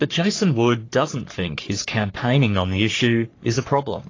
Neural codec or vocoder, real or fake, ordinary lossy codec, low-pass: codec, 44.1 kHz, 3.4 kbps, Pupu-Codec; fake; AAC, 32 kbps; 7.2 kHz